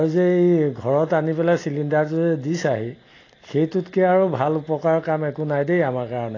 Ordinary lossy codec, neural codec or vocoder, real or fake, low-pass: AAC, 32 kbps; none; real; 7.2 kHz